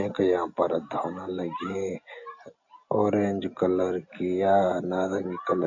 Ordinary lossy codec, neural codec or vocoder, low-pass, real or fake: AAC, 48 kbps; none; 7.2 kHz; real